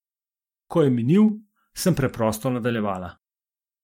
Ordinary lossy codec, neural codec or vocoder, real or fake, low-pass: MP3, 64 kbps; vocoder, 44.1 kHz, 128 mel bands every 512 samples, BigVGAN v2; fake; 19.8 kHz